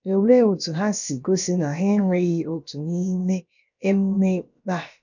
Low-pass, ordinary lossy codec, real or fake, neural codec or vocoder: 7.2 kHz; none; fake; codec, 16 kHz, about 1 kbps, DyCAST, with the encoder's durations